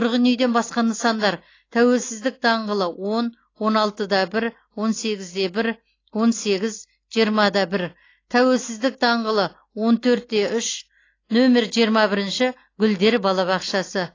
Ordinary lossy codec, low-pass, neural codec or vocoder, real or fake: AAC, 32 kbps; 7.2 kHz; none; real